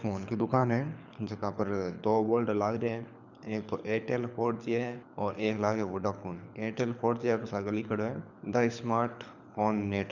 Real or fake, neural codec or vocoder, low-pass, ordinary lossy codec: fake; codec, 24 kHz, 6 kbps, HILCodec; 7.2 kHz; none